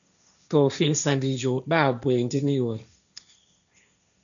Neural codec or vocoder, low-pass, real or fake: codec, 16 kHz, 1.1 kbps, Voila-Tokenizer; 7.2 kHz; fake